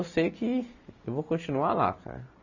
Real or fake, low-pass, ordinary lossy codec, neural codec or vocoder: real; 7.2 kHz; none; none